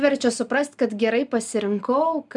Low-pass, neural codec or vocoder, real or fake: 10.8 kHz; none; real